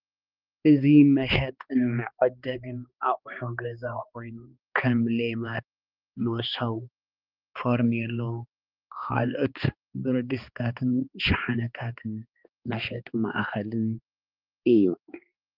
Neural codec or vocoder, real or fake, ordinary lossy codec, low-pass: codec, 16 kHz, 2 kbps, X-Codec, HuBERT features, trained on balanced general audio; fake; Opus, 32 kbps; 5.4 kHz